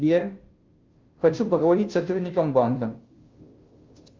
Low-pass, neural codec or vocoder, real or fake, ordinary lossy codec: 7.2 kHz; codec, 16 kHz, 0.5 kbps, FunCodec, trained on Chinese and English, 25 frames a second; fake; Opus, 24 kbps